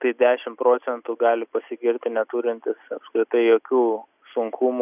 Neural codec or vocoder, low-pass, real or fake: none; 3.6 kHz; real